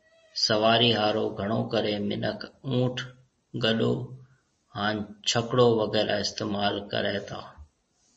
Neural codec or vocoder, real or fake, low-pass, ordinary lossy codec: none; real; 10.8 kHz; MP3, 32 kbps